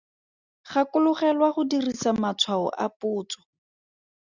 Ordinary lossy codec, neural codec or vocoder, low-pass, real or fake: Opus, 64 kbps; none; 7.2 kHz; real